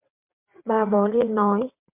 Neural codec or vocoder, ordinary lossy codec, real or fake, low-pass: vocoder, 22.05 kHz, 80 mel bands, WaveNeXt; MP3, 32 kbps; fake; 3.6 kHz